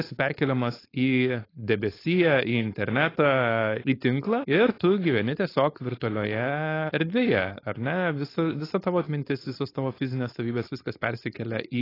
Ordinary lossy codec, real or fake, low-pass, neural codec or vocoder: AAC, 24 kbps; fake; 5.4 kHz; codec, 16 kHz, 4.8 kbps, FACodec